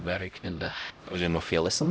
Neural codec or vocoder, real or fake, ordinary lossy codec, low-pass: codec, 16 kHz, 0.5 kbps, X-Codec, HuBERT features, trained on LibriSpeech; fake; none; none